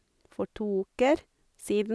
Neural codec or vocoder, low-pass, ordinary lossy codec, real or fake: none; none; none; real